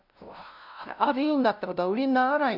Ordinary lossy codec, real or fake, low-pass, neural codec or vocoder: none; fake; 5.4 kHz; codec, 16 kHz, 0.5 kbps, FunCodec, trained on LibriTTS, 25 frames a second